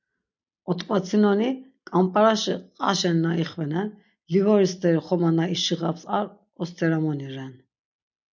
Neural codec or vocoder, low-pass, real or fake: none; 7.2 kHz; real